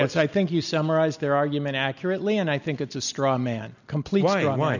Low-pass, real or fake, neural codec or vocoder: 7.2 kHz; real; none